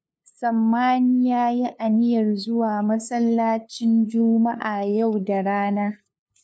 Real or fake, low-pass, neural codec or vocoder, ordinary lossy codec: fake; none; codec, 16 kHz, 2 kbps, FunCodec, trained on LibriTTS, 25 frames a second; none